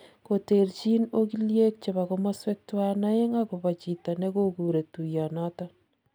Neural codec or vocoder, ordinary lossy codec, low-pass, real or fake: none; none; none; real